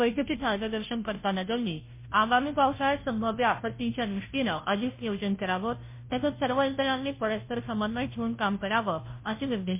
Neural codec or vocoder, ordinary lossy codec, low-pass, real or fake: codec, 16 kHz, 0.5 kbps, FunCodec, trained on Chinese and English, 25 frames a second; MP3, 24 kbps; 3.6 kHz; fake